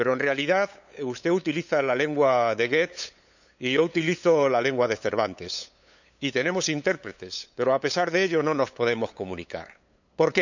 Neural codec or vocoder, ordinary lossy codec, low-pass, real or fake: codec, 16 kHz, 8 kbps, FunCodec, trained on LibriTTS, 25 frames a second; none; 7.2 kHz; fake